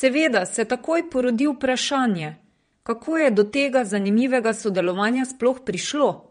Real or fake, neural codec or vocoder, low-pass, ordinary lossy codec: fake; vocoder, 22.05 kHz, 80 mel bands, Vocos; 9.9 kHz; MP3, 48 kbps